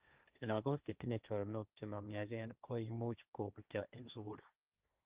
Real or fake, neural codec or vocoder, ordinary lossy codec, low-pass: fake; codec, 16 kHz, 1.1 kbps, Voila-Tokenizer; Opus, 24 kbps; 3.6 kHz